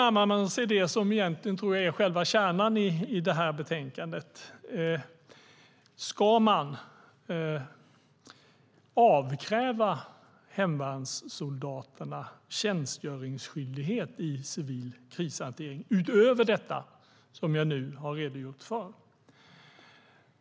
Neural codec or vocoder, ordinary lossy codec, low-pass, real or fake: none; none; none; real